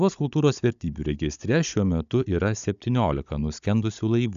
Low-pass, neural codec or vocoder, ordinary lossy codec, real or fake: 7.2 kHz; codec, 16 kHz, 16 kbps, FunCodec, trained on Chinese and English, 50 frames a second; AAC, 96 kbps; fake